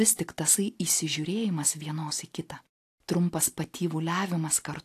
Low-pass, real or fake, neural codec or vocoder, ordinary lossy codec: 14.4 kHz; real; none; AAC, 64 kbps